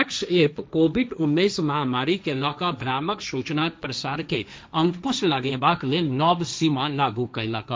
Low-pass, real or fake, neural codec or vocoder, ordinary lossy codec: none; fake; codec, 16 kHz, 1.1 kbps, Voila-Tokenizer; none